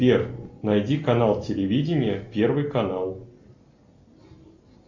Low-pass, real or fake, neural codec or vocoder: 7.2 kHz; real; none